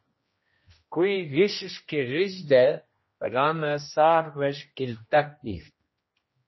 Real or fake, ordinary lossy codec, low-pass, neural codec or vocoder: fake; MP3, 24 kbps; 7.2 kHz; codec, 16 kHz, 1 kbps, X-Codec, HuBERT features, trained on general audio